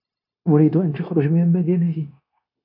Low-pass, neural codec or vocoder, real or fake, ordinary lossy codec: 5.4 kHz; codec, 16 kHz, 0.9 kbps, LongCat-Audio-Codec; fake; AAC, 32 kbps